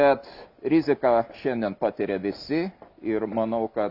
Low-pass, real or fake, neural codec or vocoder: 5.4 kHz; real; none